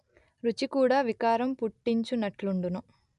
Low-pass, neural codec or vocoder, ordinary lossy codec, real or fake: 10.8 kHz; none; none; real